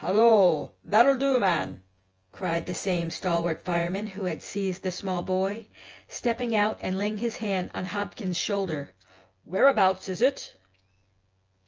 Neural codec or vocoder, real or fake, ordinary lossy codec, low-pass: vocoder, 24 kHz, 100 mel bands, Vocos; fake; Opus, 24 kbps; 7.2 kHz